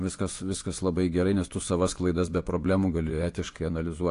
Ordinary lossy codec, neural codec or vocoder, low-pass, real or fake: AAC, 48 kbps; vocoder, 24 kHz, 100 mel bands, Vocos; 10.8 kHz; fake